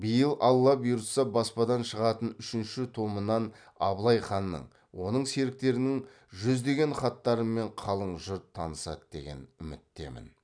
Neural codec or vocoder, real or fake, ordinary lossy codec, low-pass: none; real; none; 9.9 kHz